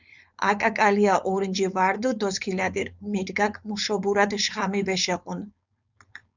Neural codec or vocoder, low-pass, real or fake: codec, 16 kHz, 4.8 kbps, FACodec; 7.2 kHz; fake